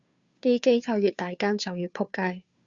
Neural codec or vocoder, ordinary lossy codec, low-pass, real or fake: codec, 16 kHz, 2 kbps, FunCodec, trained on Chinese and English, 25 frames a second; Opus, 64 kbps; 7.2 kHz; fake